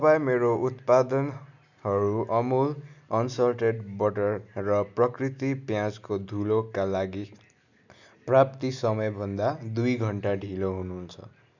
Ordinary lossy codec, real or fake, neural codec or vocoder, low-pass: none; real; none; 7.2 kHz